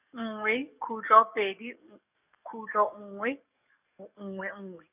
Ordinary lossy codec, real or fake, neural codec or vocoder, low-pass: none; fake; codec, 44.1 kHz, 7.8 kbps, DAC; 3.6 kHz